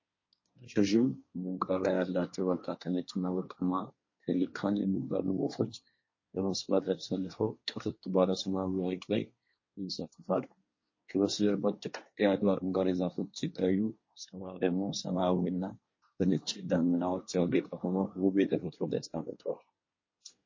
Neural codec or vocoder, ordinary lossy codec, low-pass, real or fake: codec, 24 kHz, 1 kbps, SNAC; MP3, 32 kbps; 7.2 kHz; fake